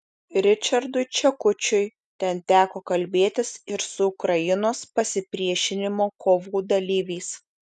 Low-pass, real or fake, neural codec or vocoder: 10.8 kHz; real; none